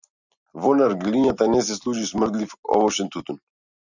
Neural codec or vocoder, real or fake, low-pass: none; real; 7.2 kHz